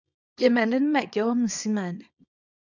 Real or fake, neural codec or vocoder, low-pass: fake; codec, 24 kHz, 0.9 kbps, WavTokenizer, small release; 7.2 kHz